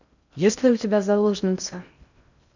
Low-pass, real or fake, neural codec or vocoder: 7.2 kHz; fake; codec, 16 kHz in and 24 kHz out, 0.6 kbps, FocalCodec, streaming, 2048 codes